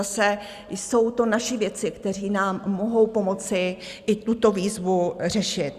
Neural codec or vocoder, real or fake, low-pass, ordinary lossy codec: none; real; 14.4 kHz; Opus, 64 kbps